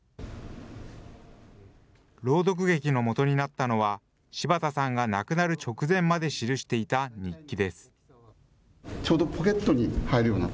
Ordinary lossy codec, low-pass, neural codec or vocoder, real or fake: none; none; none; real